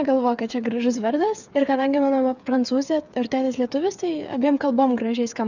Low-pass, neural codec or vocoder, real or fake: 7.2 kHz; codec, 16 kHz, 8 kbps, FreqCodec, smaller model; fake